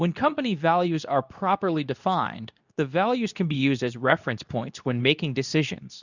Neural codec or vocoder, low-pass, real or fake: codec, 24 kHz, 0.9 kbps, WavTokenizer, medium speech release version 2; 7.2 kHz; fake